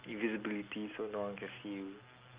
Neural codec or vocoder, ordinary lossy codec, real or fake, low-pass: none; Opus, 32 kbps; real; 3.6 kHz